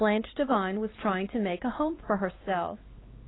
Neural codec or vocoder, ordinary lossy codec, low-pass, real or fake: codec, 16 kHz, 4 kbps, X-Codec, HuBERT features, trained on LibriSpeech; AAC, 16 kbps; 7.2 kHz; fake